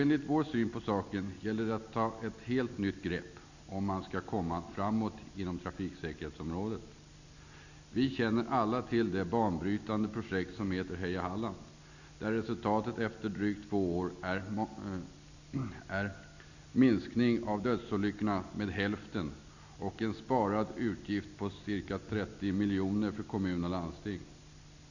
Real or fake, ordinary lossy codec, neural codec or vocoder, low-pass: real; none; none; 7.2 kHz